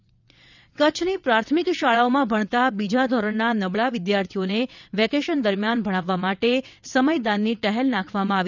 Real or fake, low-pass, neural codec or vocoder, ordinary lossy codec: fake; 7.2 kHz; vocoder, 22.05 kHz, 80 mel bands, WaveNeXt; none